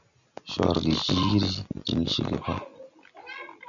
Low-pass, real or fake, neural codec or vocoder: 7.2 kHz; real; none